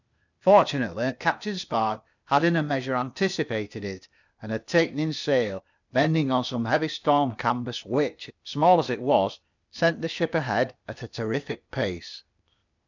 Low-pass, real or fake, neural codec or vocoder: 7.2 kHz; fake; codec, 16 kHz, 0.8 kbps, ZipCodec